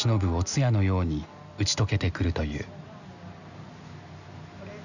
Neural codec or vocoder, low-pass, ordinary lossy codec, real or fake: none; 7.2 kHz; none; real